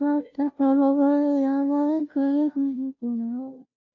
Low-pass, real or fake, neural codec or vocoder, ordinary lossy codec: 7.2 kHz; fake; codec, 16 kHz, 0.5 kbps, FunCodec, trained on Chinese and English, 25 frames a second; AAC, 48 kbps